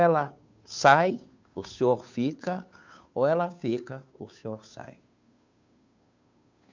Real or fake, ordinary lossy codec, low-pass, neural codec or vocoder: fake; MP3, 64 kbps; 7.2 kHz; codec, 16 kHz, 2 kbps, FunCodec, trained on Chinese and English, 25 frames a second